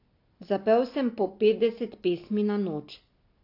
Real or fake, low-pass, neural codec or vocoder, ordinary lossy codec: real; 5.4 kHz; none; AAC, 32 kbps